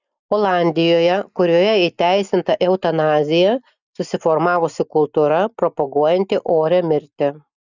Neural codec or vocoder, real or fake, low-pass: none; real; 7.2 kHz